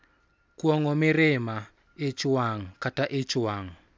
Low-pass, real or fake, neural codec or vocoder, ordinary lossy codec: none; real; none; none